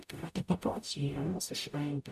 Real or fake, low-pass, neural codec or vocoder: fake; 14.4 kHz; codec, 44.1 kHz, 0.9 kbps, DAC